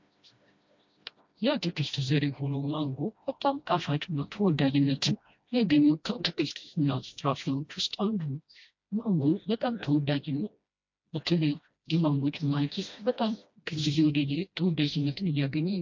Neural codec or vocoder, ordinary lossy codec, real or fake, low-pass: codec, 16 kHz, 1 kbps, FreqCodec, smaller model; MP3, 48 kbps; fake; 7.2 kHz